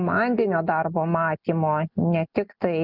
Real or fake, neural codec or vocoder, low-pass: real; none; 5.4 kHz